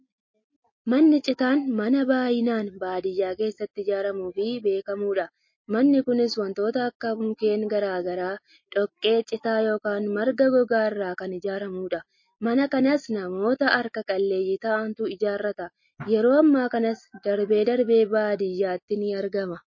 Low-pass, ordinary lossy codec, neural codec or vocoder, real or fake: 7.2 kHz; MP3, 32 kbps; none; real